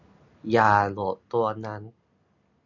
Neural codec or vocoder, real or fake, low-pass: none; real; 7.2 kHz